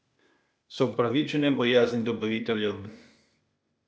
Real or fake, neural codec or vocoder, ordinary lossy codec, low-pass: fake; codec, 16 kHz, 0.8 kbps, ZipCodec; none; none